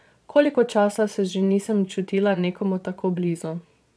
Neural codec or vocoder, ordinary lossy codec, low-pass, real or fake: vocoder, 22.05 kHz, 80 mel bands, Vocos; none; none; fake